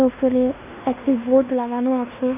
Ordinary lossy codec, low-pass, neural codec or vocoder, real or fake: none; 3.6 kHz; codec, 16 kHz in and 24 kHz out, 0.9 kbps, LongCat-Audio-Codec, fine tuned four codebook decoder; fake